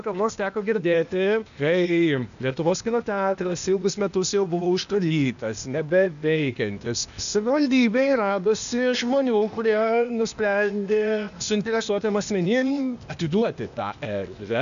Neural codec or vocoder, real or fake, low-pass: codec, 16 kHz, 0.8 kbps, ZipCodec; fake; 7.2 kHz